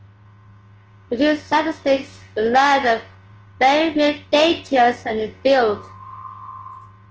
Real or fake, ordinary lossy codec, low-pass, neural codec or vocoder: fake; Opus, 16 kbps; 7.2 kHz; codec, 24 kHz, 0.9 kbps, WavTokenizer, large speech release